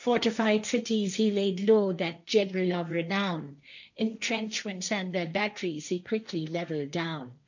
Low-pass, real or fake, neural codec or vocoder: 7.2 kHz; fake; codec, 16 kHz, 1.1 kbps, Voila-Tokenizer